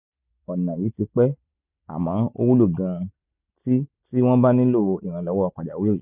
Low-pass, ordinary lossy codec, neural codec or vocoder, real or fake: 3.6 kHz; none; none; real